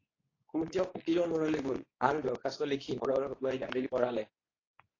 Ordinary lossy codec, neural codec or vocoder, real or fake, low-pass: AAC, 32 kbps; codec, 24 kHz, 0.9 kbps, WavTokenizer, medium speech release version 2; fake; 7.2 kHz